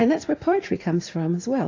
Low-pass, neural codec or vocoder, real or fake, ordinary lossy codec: 7.2 kHz; vocoder, 44.1 kHz, 80 mel bands, Vocos; fake; AAC, 32 kbps